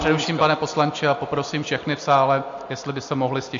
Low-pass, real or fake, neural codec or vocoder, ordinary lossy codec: 7.2 kHz; real; none; MP3, 48 kbps